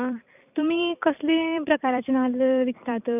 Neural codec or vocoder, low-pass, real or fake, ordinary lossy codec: vocoder, 44.1 kHz, 128 mel bands every 256 samples, BigVGAN v2; 3.6 kHz; fake; none